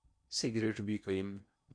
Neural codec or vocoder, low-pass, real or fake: codec, 16 kHz in and 24 kHz out, 0.8 kbps, FocalCodec, streaming, 65536 codes; 9.9 kHz; fake